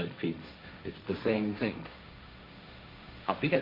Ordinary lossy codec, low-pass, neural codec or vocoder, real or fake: MP3, 32 kbps; 5.4 kHz; codec, 16 kHz, 1.1 kbps, Voila-Tokenizer; fake